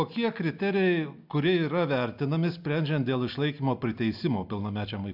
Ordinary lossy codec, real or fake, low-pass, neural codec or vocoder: AAC, 48 kbps; real; 5.4 kHz; none